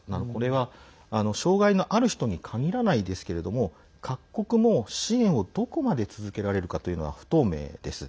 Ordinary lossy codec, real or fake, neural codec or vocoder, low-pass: none; real; none; none